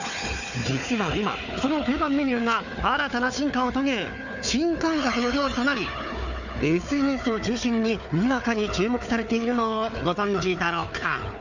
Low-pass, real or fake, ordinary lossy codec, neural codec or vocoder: 7.2 kHz; fake; none; codec, 16 kHz, 4 kbps, FunCodec, trained on Chinese and English, 50 frames a second